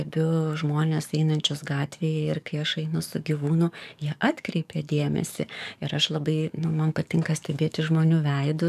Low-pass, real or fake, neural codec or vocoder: 14.4 kHz; fake; codec, 44.1 kHz, 7.8 kbps, DAC